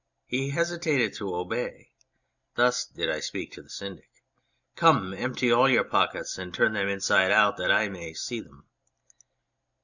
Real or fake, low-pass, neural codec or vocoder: real; 7.2 kHz; none